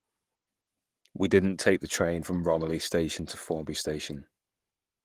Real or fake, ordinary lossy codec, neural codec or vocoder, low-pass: fake; Opus, 24 kbps; codec, 44.1 kHz, 7.8 kbps, Pupu-Codec; 14.4 kHz